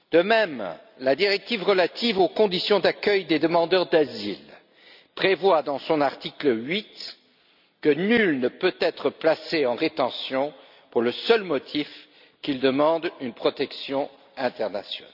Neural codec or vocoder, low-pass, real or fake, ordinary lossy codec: none; 5.4 kHz; real; none